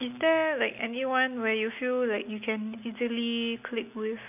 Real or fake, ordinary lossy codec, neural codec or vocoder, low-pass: real; none; none; 3.6 kHz